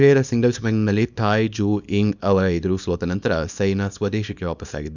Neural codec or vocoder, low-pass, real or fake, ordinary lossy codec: codec, 24 kHz, 0.9 kbps, WavTokenizer, small release; 7.2 kHz; fake; none